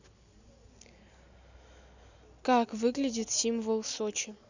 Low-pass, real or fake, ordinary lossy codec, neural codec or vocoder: 7.2 kHz; real; none; none